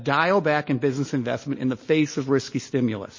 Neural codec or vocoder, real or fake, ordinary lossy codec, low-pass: none; real; MP3, 32 kbps; 7.2 kHz